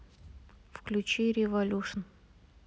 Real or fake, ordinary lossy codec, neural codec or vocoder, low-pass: real; none; none; none